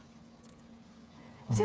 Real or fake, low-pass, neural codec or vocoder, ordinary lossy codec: fake; none; codec, 16 kHz, 8 kbps, FreqCodec, smaller model; none